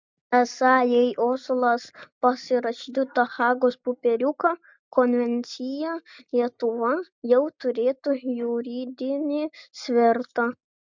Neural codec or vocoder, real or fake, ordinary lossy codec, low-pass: none; real; MP3, 64 kbps; 7.2 kHz